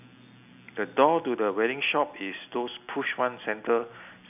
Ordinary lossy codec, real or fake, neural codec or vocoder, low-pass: none; real; none; 3.6 kHz